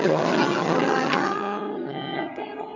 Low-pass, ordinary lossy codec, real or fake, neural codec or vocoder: 7.2 kHz; none; fake; vocoder, 22.05 kHz, 80 mel bands, HiFi-GAN